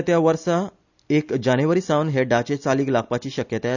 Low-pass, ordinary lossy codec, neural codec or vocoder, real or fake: 7.2 kHz; none; none; real